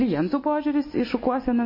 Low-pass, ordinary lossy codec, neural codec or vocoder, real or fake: 5.4 kHz; MP3, 24 kbps; none; real